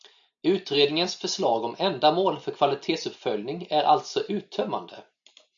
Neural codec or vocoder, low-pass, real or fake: none; 7.2 kHz; real